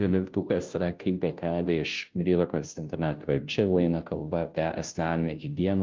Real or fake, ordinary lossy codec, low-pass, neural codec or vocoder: fake; Opus, 24 kbps; 7.2 kHz; codec, 16 kHz, 0.5 kbps, FunCodec, trained on Chinese and English, 25 frames a second